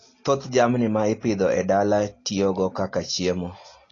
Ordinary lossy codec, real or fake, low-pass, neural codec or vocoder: AAC, 32 kbps; real; 7.2 kHz; none